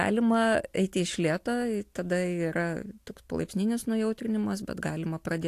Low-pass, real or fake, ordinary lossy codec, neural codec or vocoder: 14.4 kHz; real; AAC, 64 kbps; none